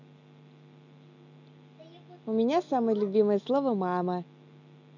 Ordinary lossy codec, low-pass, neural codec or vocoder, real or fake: none; 7.2 kHz; none; real